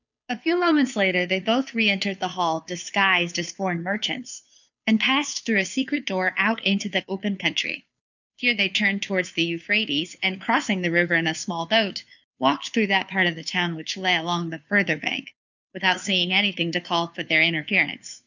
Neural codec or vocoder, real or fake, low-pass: codec, 16 kHz, 2 kbps, FunCodec, trained on Chinese and English, 25 frames a second; fake; 7.2 kHz